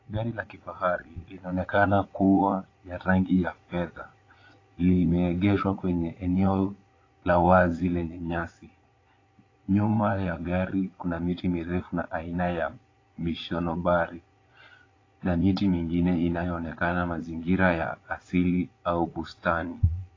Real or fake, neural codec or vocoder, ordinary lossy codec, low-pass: fake; vocoder, 22.05 kHz, 80 mel bands, Vocos; AAC, 32 kbps; 7.2 kHz